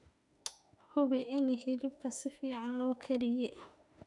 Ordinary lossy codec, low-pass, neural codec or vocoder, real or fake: none; 10.8 kHz; autoencoder, 48 kHz, 32 numbers a frame, DAC-VAE, trained on Japanese speech; fake